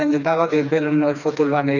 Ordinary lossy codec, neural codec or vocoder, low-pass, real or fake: none; codec, 16 kHz, 2 kbps, FreqCodec, smaller model; 7.2 kHz; fake